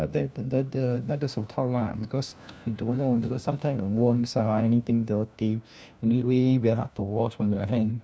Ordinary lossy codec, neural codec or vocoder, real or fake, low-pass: none; codec, 16 kHz, 1 kbps, FunCodec, trained on LibriTTS, 50 frames a second; fake; none